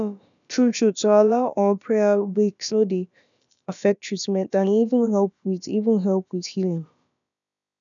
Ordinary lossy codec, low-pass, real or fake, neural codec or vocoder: none; 7.2 kHz; fake; codec, 16 kHz, about 1 kbps, DyCAST, with the encoder's durations